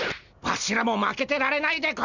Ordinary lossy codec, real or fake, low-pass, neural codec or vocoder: none; real; 7.2 kHz; none